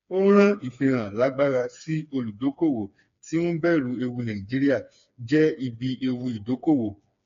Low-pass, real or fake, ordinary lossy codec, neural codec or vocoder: 7.2 kHz; fake; MP3, 48 kbps; codec, 16 kHz, 4 kbps, FreqCodec, smaller model